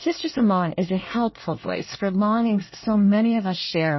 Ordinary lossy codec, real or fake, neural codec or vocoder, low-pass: MP3, 24 kbps; fake; codec, 24 kHz, 1 kbps, SNAC; 7.2 kHz